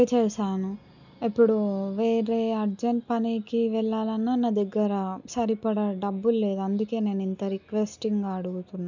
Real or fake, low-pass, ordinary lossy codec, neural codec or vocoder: real; 7.2 kHz; none; none